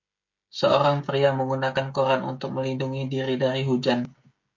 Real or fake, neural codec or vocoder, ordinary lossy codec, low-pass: fake; codec, 16 kHz, 16 kbps, FreqCodec, smaller model; MP3, 48 kbps; 7.2 kHz